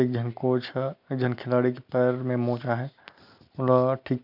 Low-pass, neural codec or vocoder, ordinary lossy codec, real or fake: 5.4 kHz; none; none; real